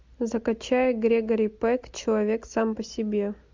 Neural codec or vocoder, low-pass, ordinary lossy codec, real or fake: none; 7.2 kHz; MP3, 64 kbps; real